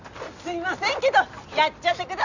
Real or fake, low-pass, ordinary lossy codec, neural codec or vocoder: real; 7.2 kHz; none; none